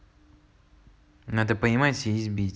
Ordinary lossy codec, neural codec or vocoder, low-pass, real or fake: none; none; none; real